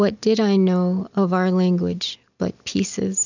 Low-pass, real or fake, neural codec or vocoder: 7.2 kHz; real; none